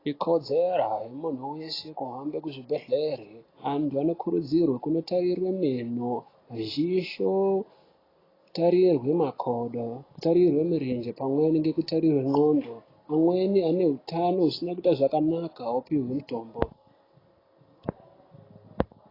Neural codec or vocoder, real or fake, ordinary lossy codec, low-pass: none; real; AAC, 24 kbps; 5.4 kHz